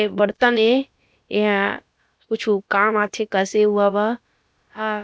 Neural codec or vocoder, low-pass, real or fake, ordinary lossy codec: codec, 16 kHz, about 1 kbps, DyCAST, with the encoder's durations; none; fake; none